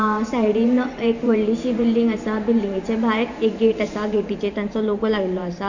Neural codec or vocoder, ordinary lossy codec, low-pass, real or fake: vocoder, 44.1 kHz, 128 mel bands every 512 samples, BigVGAN v2; none; 7.2 kHz; fake